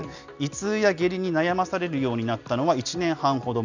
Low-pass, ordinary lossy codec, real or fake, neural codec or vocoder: 7.2 kHz; none; real; none